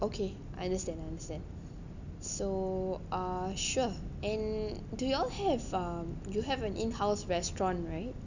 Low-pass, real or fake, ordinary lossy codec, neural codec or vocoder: 7.2 kHz; real; none; none